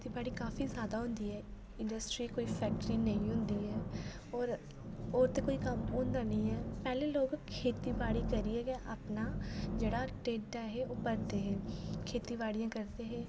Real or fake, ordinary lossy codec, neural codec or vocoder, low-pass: real; none; none; none